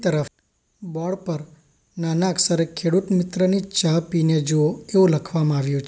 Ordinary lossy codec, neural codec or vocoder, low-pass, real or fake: none; none; none; real